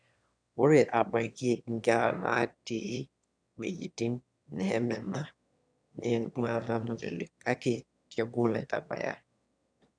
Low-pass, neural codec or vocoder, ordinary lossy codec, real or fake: 9.9 kHz; autoencoder, 22.05 kHz, a latent of 192 numbers a frame, VITS, trained on one speaker; none; fake